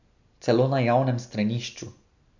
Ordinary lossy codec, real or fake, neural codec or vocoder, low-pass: none; real; none; 7.2 kHz